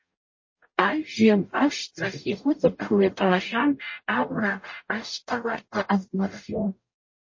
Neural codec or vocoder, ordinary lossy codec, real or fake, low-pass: codec, 44.1 kHz, 0.9 kbps, DAC; MP3, 32 kbps; fake; 7.2 kHz